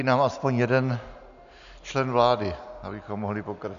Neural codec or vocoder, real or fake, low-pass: none; real; 7.2 kHz